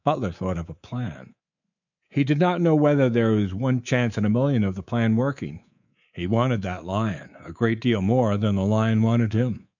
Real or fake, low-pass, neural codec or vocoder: fake; 7.2 kHz; codec, 24 kHz, 3.1 kbps, DualCodec